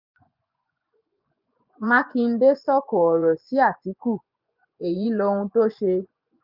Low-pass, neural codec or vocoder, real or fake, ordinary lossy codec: 5.4 kHz; vocoder, 24 kHz, 100 mel bands, Vocos; fake; none